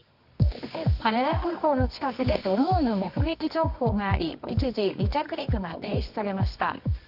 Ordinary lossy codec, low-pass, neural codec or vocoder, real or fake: AAC, 48 kbps; 5.4 kHz; codec, 24 kHz, 0.9 kbps, WavTokenizer, medium music audio release; fake